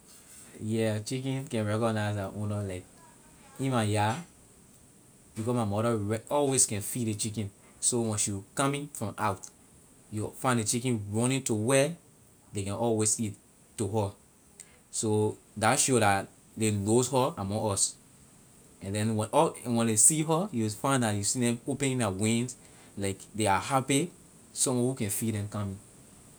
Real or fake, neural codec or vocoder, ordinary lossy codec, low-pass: real; none; none; none